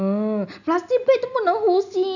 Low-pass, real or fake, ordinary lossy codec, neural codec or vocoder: 7.2 kHz; real; none; none